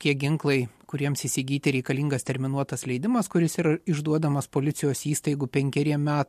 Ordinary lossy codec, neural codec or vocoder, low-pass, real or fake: MP3, 64 kbps; none; 14.4 kHz; real